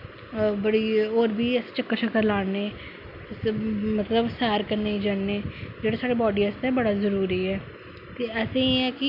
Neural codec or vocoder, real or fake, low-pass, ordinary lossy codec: none; real; 5.4 kHz; none